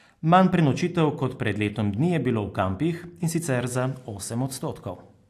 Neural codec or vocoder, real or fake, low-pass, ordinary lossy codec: none; real; 14.4 kHz; AAC, 64 kbps